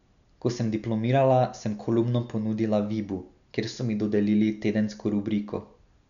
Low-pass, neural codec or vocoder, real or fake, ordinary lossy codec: 7.2 kHz; none; real; none